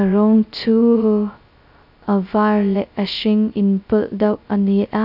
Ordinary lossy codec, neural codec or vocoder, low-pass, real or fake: none; codec, 16 kHz, 0.2 kbps, FocalCodec; 5.4 kHz; fake